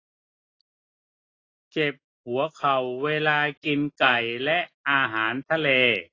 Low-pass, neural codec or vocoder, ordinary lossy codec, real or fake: 7.2 kHz; none; AAC, 32 kbps; real